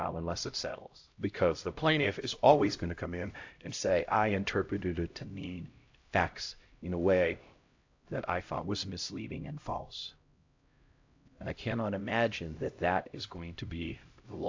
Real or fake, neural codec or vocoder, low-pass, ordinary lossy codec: fake; codec, 16 kHz, 0.5 kbps, X-Codec, HuBERT features, trained on LibriSpeech; 7.2 kHz; AAC, 48 kbps